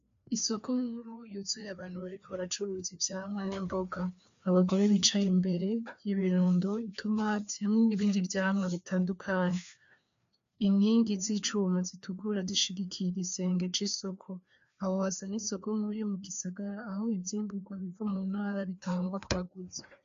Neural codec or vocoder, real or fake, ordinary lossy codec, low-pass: codec, 16 kHz, 2 kbps, FreqCodec, larger model; fake; MP3, 64 kbps; 7.2 kHz